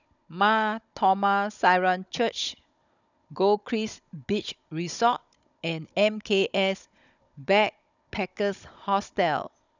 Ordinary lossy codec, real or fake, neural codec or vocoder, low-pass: none; fake; codec, 16 kHz, 16 kbps, FunCodec, trained on Chinese and English, 50 frames a second; 7.2 kHz